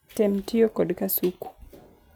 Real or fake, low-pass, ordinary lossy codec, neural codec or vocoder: real; none; none; none